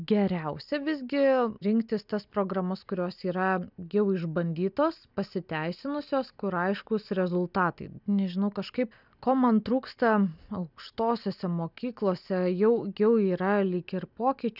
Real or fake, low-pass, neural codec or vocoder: real; 5.4 kHz; none